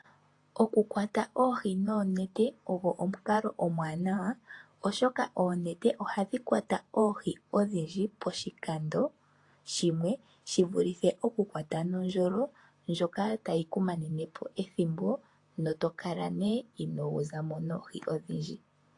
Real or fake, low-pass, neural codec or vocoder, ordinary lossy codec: fake; 10.8 kHz; vocoder, 48 kHz, 128 mel bands, Vocos; AAC, 48 kbps